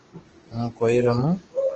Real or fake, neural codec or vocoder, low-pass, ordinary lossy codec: fake; codec, 16 kHz, 6 kbps, DAC; 7.2 kHz; Opus, 16 kbps